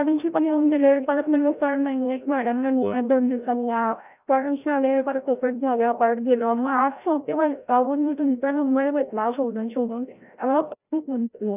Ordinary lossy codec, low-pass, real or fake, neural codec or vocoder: none; 3.6 kHz; fake; codec, 16 kHz, 0.5 kbps, FreqCodec, larger model